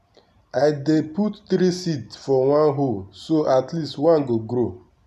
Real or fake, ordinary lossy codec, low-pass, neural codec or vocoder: real; none; 14.4 kHz; none